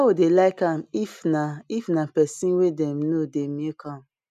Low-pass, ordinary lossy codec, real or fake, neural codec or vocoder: 14.4 kHz; none; real; none